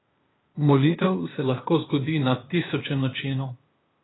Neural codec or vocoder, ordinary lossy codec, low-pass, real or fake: codec, 16 kHz, 0.8 kbps, ZipCodec; AAC, 16 kbps; 7.2 kHz; fake